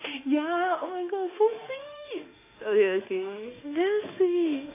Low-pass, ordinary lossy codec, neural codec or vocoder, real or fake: 3.6 kHz; AAC, 24 kbps; autoencoder, 48 kHz, 32 numbers a frame, DAC-VAE, trained on Japanese speech; fake